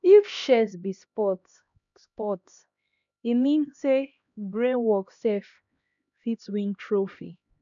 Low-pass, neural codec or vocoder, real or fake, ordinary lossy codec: 7.2 kHz; codec, 16 kHz, 1 kbps, X-Codec, HuBERT features, trained on LibriSpeech; fake; none